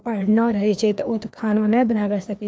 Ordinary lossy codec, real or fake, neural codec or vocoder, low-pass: none; fake; codec, 16 kHz, 2 kbps, FreqCodec, larger model; none